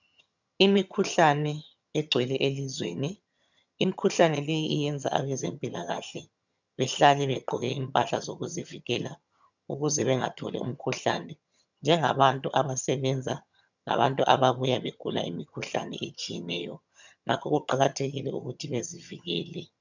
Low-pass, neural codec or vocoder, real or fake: 7.2 kHz; vocoder, 22.05 kHz, 80 mel bands, HiFi-GAN; fake